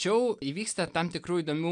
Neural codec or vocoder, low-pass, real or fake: none; 9.9 kHz; real